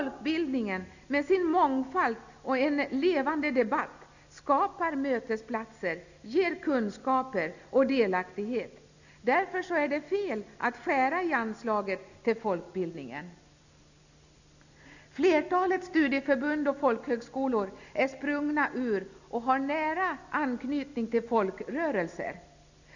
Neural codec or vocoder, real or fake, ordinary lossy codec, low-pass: none; real; none; 7.2 kHz